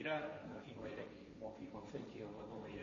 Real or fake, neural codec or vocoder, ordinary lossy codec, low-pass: fake; codec, 16 kHz, 1.1 kbps, Voila-Tokenizer; MP3, 32 kbps; 7.2 kHz